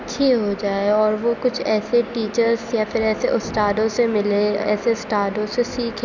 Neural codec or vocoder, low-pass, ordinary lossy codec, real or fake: none; 7.2 kHz; none; real